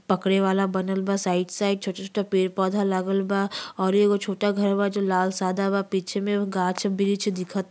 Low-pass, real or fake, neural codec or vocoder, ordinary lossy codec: none; real; none; none